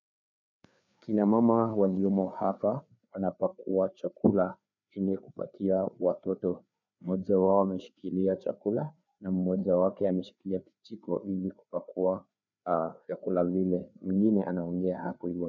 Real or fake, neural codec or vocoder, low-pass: fake; codec, 16 kHz, 4 kbps, FreqCodec, larger model; 7.2 kHz